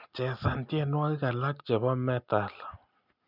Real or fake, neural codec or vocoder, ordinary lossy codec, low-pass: real; none; none; 5.4 kHz